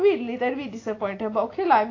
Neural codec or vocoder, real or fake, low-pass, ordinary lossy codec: none; real; 7.2 kHz; AAC, 32 kbps